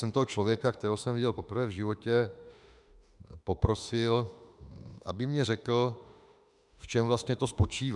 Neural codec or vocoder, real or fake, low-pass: autoencoder, 48 kHz, 32 numbers a frame, DAC-VAE, trained on Japanese speech; fake; 10.8 kHz